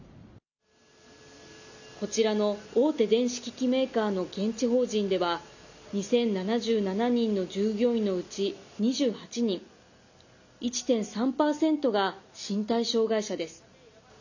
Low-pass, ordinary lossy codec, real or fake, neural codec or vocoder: 7.2 kHz; none; real; none